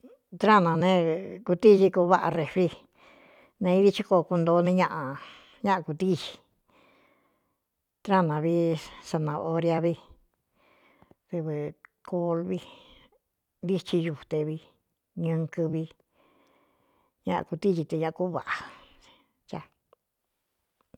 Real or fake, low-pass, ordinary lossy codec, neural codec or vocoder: fake; 19.8 kHz; none; vocoder, 44.1 kHz, 128 mel bands every 256 samples, BigVGAN v2